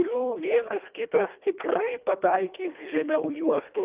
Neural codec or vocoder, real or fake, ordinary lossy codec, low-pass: codec, 24 kHz, 1.5 kbps, HILCodec; fake; Opus, 32 kbps; 3.6 kHz